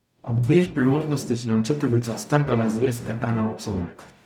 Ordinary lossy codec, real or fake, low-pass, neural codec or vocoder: none; fake; 19.8 kHz; codec, 44.1 kHz, 0.9 kbps, DAC